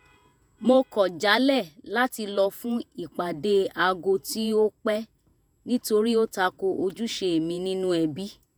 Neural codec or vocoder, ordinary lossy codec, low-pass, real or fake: vocoder, 48 kHz, 128 mel bands, Vocos; none; none; fake